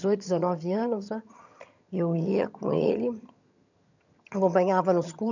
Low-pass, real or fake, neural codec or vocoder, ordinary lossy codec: 7.2 kHz; fake; vocoder, 22.05 kHz, 80 mel bands, HiFi-GAN; none